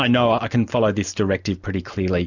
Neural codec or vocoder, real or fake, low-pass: none; real; 7.2 kHz